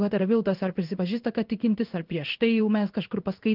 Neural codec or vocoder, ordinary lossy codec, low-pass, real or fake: codec, 16 kHz in and 24 kHz out, 1 kbps, XY-Tokenizer; Opus, 32 kbps; 5.4 kHz; fake